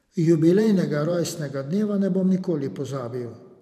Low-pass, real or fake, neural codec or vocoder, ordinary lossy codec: 14.4 kHz; fake; vocoder, 44.1 kHz, 128 mel bands every 512 samples, BigVGAN v2; none